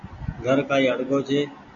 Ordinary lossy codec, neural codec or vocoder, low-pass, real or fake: MP3, 96 kbps; none; 7.2 kHz; real